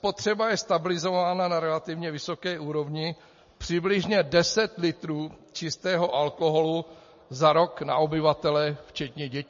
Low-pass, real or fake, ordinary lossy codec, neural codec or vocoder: 7.2 kHz; real; MP3, 32 kbps; none